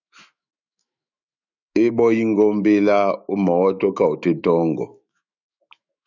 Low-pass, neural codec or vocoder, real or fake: 7.2 kHz; autoencoder, 48 kHz, 128 numbers a frame, DAC-VAE, trained on Japanese speech; fake